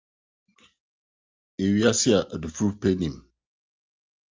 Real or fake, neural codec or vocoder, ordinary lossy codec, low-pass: real; none; Opus, 32 kbps; 7.2 kHz